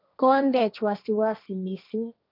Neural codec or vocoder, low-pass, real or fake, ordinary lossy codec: codec, 16 kHz, 1.1 kbps, Voila-Tokenizer; 5.4 kHz; fake; none